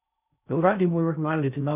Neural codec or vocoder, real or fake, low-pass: codec, 16 kHz in and 24 kHz out, 0.6 kbps, FocalCodec, streaming, 4096 codes; fake; 3.6 kHz